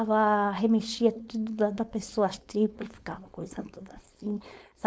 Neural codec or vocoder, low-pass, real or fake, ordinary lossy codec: codec, 16 kHz, 4.8 kbps, FACodec; none; fake; none